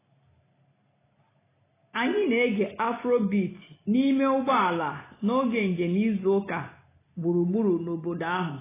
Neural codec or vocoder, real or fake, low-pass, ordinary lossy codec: none; real; 3.6 kHz; AAC, 16 kbps